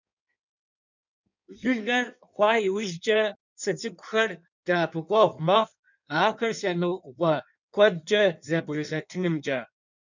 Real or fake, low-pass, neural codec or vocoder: fake; 7.2 kHz; codec, 16 kHz in and 24 kHz out, 1.1 kbps, FireRedTTS-2 codec